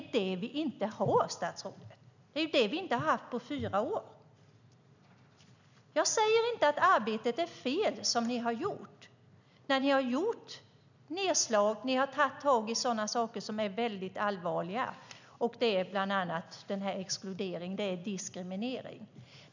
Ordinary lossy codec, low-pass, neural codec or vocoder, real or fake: none; 7.2 kHz; none; real